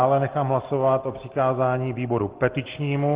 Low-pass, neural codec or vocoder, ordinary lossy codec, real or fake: 3.6 kHz; none; Opus, 16 kbps; real